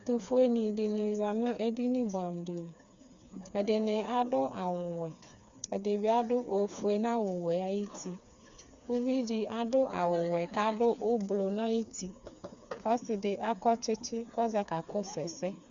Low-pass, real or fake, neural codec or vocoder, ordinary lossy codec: 7.2 kHz; fake; codec, 16 kHz, 4 kbps, FreqCodec, smaller model; Opus, 64 kbps